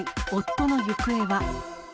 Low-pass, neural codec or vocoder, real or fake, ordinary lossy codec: none; none; real; none